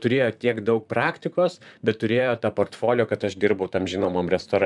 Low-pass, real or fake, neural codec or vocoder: 10.8 kHz; fake; vocoder, 44.1 kHz, 128 mel bands, Pupu-Vocoder